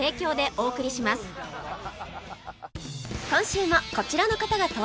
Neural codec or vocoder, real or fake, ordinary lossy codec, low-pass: none; real; none; none